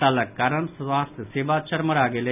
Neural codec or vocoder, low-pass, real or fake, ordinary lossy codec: none; 3.6 kHz; real; none